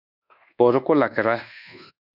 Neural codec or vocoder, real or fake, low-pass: codec, 24 kHz, 1.2 kbps, DualCodec; fake; 5.4 kHz